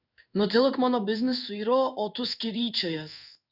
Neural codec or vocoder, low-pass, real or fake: codec, 16 kHz in and 24 kHz out, 1 kbps, XY-Tokenizer; 5.4 kHz; fake